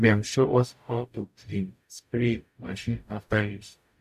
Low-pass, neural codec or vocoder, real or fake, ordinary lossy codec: 14.4 kHz; codec, 44.1 kHz, 0.9 kbps, DAC; fake; none